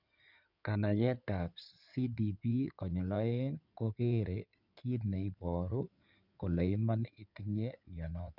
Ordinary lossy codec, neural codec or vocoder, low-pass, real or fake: none; codec, 16 kHz in and 24 kHz out, 2.2 kbps, FireRedTTS-2 codec; 5.4 kHz; fake